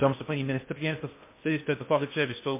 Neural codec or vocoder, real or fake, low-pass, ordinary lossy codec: codec, 16 kHz in and 24 kHz out, 0.6 kbps, FocalCodec, streaming, 2048 codes; fake; 3.6 kHz; MP3, 24 kbps